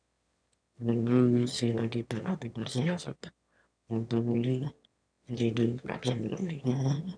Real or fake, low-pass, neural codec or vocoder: fake; 9.9 kHz; autoencoder, 22.05 kHz, a latent of 192 numbers a frame, VITS, trained on one speaker